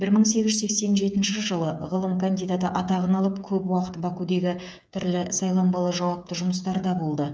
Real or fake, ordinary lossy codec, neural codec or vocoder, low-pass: fake; none; codec, 16 kHz, 8 kbps, FreqCodec, smaller model; none